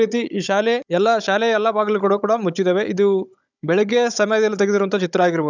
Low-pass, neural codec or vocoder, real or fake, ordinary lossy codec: 7.2 kHz; codec, 16 kHz, 16 kbps, FunCodec, trained on Chinese and English, 50 frames a second; fake; none